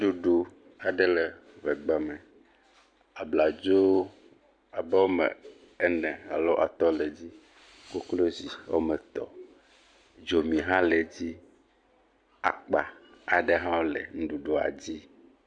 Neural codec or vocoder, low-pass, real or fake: none; 9.9 kHz; real